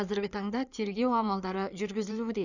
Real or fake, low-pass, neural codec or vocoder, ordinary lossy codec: fake; 7.2 kHz; codec, 16 kHz in and 24 kHz out, 2.2 kbps, FireRedTTS-2 codec; none